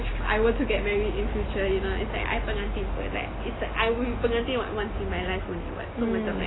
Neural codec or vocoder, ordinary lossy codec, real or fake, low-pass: none; AAC, 16 kbps; real; 7.2 kHz